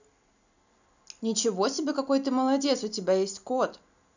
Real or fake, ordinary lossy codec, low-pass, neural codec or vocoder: real; none; 7.2 kHz; none